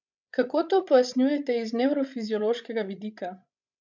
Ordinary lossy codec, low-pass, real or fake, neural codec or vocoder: none; 7.2 kHz; fake; codec, 16 kHz, 16 kbps, FreqCodec, larger model